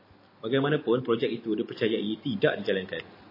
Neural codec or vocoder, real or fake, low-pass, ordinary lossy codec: none; real; 5.4 kHz; MP3, 24 kbps